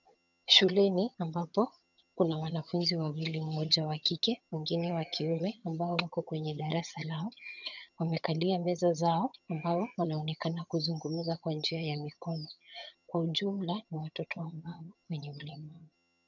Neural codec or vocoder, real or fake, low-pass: vocoder, 22.05 kHz, 80 mel bands, HiFi-GAN; fake; 7.2 kHz